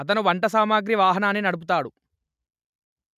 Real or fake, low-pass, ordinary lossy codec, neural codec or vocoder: real; 14.4 kHz; none; none